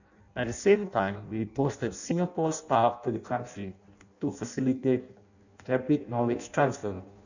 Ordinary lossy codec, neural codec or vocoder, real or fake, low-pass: none; codec, 16 kHz in and 24 kHz out, 0.6 kbps, FireRedTTS-2 codec; fake; 7.2 kHz